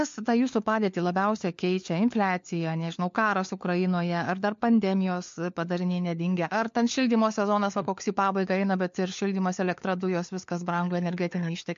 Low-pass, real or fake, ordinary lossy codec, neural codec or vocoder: 7.2 kHz; fake; MP3, 48 kbps; codec, 16 kHz, 4 kbps, FunCodec, trained on LibriTTS, 50 frames a second